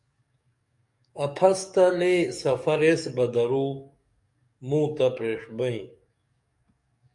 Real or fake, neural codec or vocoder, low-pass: fake; codec, 44.1 kHz, 7.8 kbps, DAC; 10.8 kHz